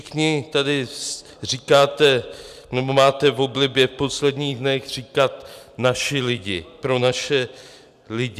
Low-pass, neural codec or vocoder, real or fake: 14.4 kHz; none; real